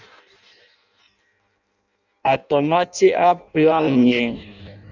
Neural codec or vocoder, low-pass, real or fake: codec, 16 kHz in and 24 kHz out, 0.6 kbps, FireRedTTS-2 codec; 7.2 kHz; fake